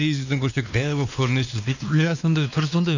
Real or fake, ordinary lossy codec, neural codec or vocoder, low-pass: fake; none; codec, 16 kHz, 2 kbps, X-Codec, WavLM features, trained on Multilingual LibriSpeech; 7.2 kHz